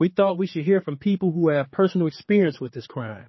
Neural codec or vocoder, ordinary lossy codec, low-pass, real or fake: codec, 16 kHz, 4 kbps, X-Codec, HuBERT features, trained on general audio; MP3, 24 kbps; 7.2 kHz; fake